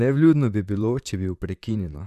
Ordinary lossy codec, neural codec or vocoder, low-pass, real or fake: none; vocoder, 44.1 kHz, 128 mel bands, Pupu-Vocoder; 14.4 kHz; fake